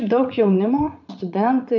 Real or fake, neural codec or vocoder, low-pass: fake; vocoder, 44.1 kHz, 80 mel bands, Vocos; 7.2 kHz